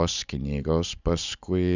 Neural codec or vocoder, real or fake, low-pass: none; real; 7.2 kHz